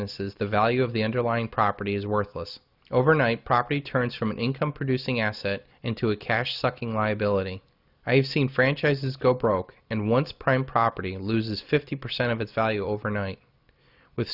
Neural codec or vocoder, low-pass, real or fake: none; 5.4 kHz; real